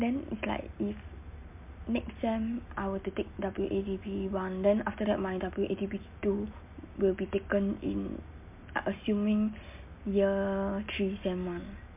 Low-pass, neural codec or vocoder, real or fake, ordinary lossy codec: 3.6 kHz; none; real; MP3, 32 kbps